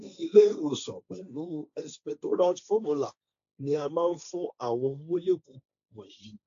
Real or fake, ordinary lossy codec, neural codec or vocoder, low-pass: fake; AAC, 64 kbps; codec, 16 kHz, 1.1 kbps, Voila-Tokenizer; 7.2 kHz